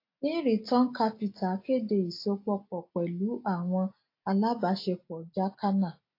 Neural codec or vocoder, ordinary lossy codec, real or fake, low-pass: none; AAC, 32 kbps; real; 5.4 kHz